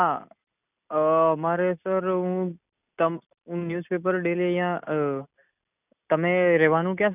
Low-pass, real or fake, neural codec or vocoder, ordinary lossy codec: 3.6 kHz; real; none; none